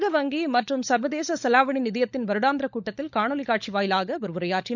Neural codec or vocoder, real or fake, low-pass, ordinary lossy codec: codec, 16 kHz, 16 kbps, FunCodec, trained on LibriTTS, 50 frames a second; fake; 7.2 kHz; none